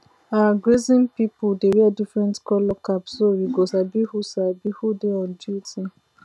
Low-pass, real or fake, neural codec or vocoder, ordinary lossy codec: none; real; none; none